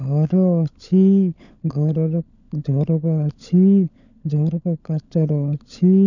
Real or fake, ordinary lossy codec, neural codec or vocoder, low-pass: fake; none; codec, 16 kHz, 16 kbps, FunCodec, trained on LibriTTS, 50 frames a second; 7.2 kHz